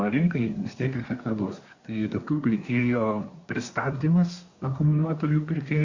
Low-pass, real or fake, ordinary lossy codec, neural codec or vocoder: 7.2 kHz; fake; Opus, 64 kbps; codec, 24 kHz, 1 kbps, SNAC